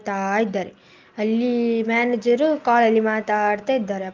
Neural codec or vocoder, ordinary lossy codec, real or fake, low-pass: none; Opus, 24 kbps; real; 7.2 kHz